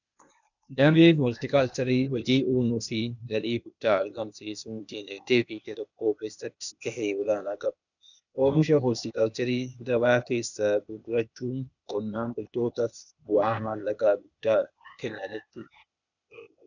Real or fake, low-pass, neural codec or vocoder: fake; 7.2 kHz; codec, 16 kHz, 0.8 kbps, ZipCodec